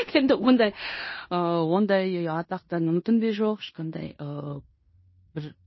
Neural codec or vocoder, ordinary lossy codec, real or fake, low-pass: codec, 16 kHz in and 24 kHz out, 0.9 kbps, LongCat-Audio-Codec, fine tuned four codebook decoder; MP3, 24 kbps; fake; 7.2 kHz